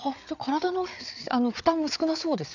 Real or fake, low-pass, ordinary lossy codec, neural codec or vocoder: fake; 7.2 kHz; none; codec, 16 kHz, 8 kbps, FreqCodec, larger model